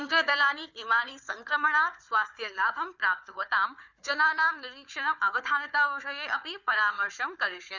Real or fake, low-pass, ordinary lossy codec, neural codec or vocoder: fake; 7.2 kHz; none; codec, 16 kHz, 4 kbps, FunCodec, trained on Chinese and English, 50 frames a second